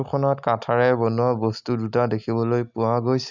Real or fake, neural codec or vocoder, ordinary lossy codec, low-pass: real; none; none; 7.2 kHz